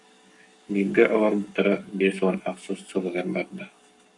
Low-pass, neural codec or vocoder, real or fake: 10.8 kHz; codec, 44.1 kHz, 7.8 kbps, Pupu-Codec; fake